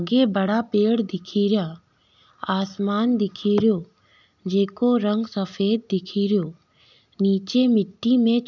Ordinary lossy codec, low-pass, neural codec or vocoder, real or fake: none; 7.2 kHz; none; real